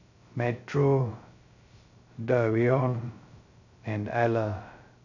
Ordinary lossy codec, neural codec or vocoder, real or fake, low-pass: none; codec, 16 kHz, 0.2 kbps, FocalCodec; fake; 7.2 kHz